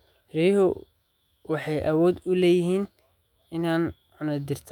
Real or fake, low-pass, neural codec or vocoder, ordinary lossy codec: fake; 19.8 kHz; autoencoder, 48 kHz, 128 numbers a frame, DAC-VAE, trained on Japanese speech; none